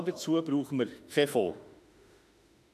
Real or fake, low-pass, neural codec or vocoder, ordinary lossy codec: fake; 14.4 kHz; autoencoder, 48 kHz, 32 numbers a frame, DAC-VAE, trained on Japanese speech; none